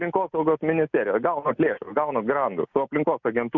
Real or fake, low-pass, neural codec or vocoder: real; 7.2 kHz; none